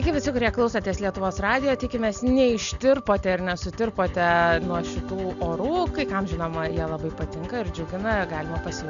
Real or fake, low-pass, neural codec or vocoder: real; 7.2 kHz; none